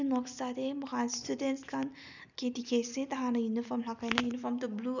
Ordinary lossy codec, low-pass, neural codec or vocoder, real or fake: none; 7.2 kHz; none; real